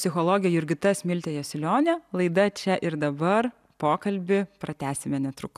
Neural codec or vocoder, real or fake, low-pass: none; real; 14.4 kHz